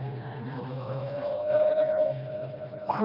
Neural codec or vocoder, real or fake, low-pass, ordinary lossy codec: codec, 16 kHz, 1 kbps, FreqCodec, smaller model; fake; 5.4 kHz; none